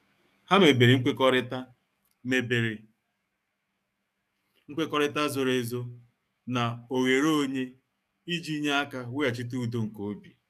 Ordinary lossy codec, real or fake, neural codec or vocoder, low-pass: none; fake; codec, 44.1 kHz, 7.8 kbps, DAC; 14.4 kHz